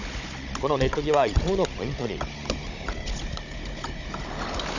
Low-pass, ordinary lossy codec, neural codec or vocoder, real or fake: 7.2 kHz; none; codec, 16 kHz, 16 kbps, FunCodec, trained on Chinese and English, 50 frames a second; fake